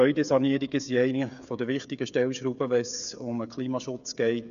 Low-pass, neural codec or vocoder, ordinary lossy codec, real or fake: 7.2 kHz; codec, 16 kHz, 8 kbps, FreqCodec, smaller model; none; fake